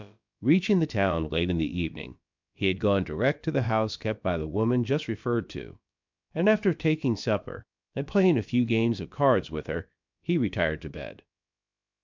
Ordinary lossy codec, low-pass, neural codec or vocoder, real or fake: MP3, 64 kbps; 7.2 kHz; codec, 16 kHz, about 1 kbps, DyCAST, with the encoder's durations; fake